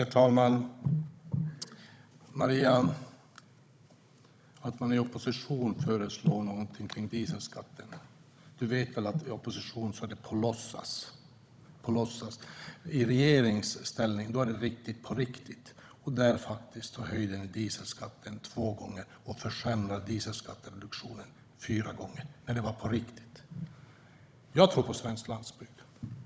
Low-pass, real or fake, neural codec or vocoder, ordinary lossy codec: none; fake; codec, 16 kHz, 16 kbps, FunCodec, trained on Chinese and English, 50 frames a second; none